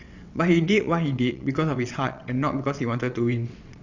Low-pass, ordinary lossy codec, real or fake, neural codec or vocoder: 7.2 kHz; Opus, 64 kbps; fake; vocoder, 22.05 kHz, 80 mel bands, WaveNeXt